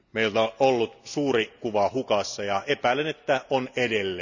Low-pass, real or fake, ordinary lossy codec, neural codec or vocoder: 7.2 kHz; real; none; none